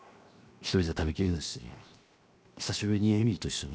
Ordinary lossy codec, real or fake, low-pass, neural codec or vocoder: none; fake; none; codec, 16 kHz, 0.7 kbps, FocalCodec